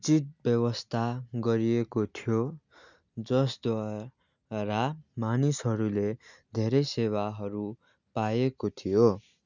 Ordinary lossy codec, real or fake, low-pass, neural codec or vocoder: none; real; 7.2 kHz; none